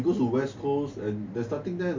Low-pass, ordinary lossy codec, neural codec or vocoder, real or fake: 7.2 kHz; MP3, 64 kbps; none; real